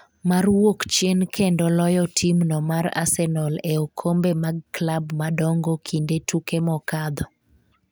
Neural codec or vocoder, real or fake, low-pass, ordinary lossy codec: none; real; none; none